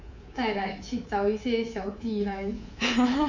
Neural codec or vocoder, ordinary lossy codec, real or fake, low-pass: codec, 24 kHz, 3.1 kbps, DualCodec; none; fake; 7.2 kHz